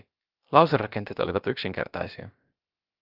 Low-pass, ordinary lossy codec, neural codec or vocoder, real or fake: 5.4 kHz; Opus, 24 kbps; codec, 16 kHz, about 1 kbps, DyCAST, with the encoder's durations; fake